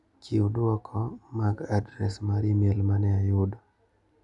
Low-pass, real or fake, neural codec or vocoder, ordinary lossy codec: 10.8 kHz; real; none; none